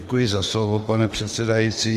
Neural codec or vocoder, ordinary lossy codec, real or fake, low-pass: autoencoder, 48 kHz, 32 numbers a frame, DAC-VAE, trained on Japanese speech; Opus, 24 kbps; fake; 14.4 kHz